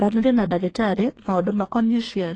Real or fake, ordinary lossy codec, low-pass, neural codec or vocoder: fake; AAC, 32 kbps; 9.9 kHz; codec, 32 kHz, 1.9 kbps, SNAC